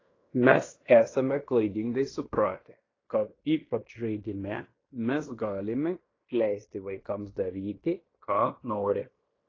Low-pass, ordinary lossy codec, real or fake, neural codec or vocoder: 7.2 kHz; AAC, 32 kbps; fake; codec, 16 kHz in and 24 kHz out, 0.9 kbps, LongCat-Audio-Codec, fine tuned four codebook decoder